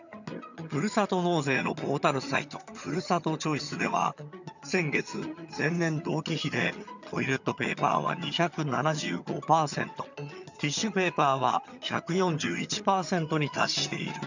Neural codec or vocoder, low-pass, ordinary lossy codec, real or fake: vocoder, 22.05 kHz, 80 mel bands, HiFi-GAN; 7.2 kHz; none; fake